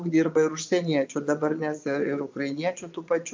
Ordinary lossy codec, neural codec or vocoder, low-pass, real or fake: MP3, 64 kbps; vocoder, 22.05 kHz, 80 mel bands, Vocos; 7.2 kHz; fake